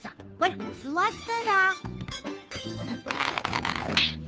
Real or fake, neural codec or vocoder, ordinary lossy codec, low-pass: fake; codec, 16 kHz, 2 kbps, FunCodec, trained on Chinese and English, 25 frames a second; none; none